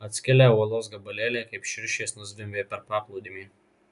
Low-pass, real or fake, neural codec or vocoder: 10.8 kHz; real; none